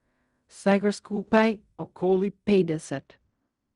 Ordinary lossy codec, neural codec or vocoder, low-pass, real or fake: Opus, 64 kbps; codec, 16 kHz in and 24 kHz out, 0.4 kbps, LongCat-Audio-Codec, fine tuned four codebook decoder; 10.8 kHz; fake